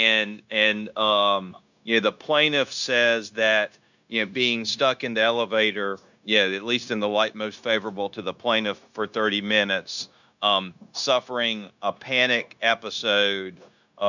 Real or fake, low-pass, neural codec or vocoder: fake; 7.2 kHz; codec, 16 kHz, 0.9 kbps, LongCat-Audio-Codec